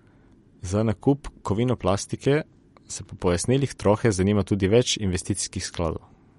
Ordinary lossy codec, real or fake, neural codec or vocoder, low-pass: MP3, 48 kbps; real; none; 19.8 kHz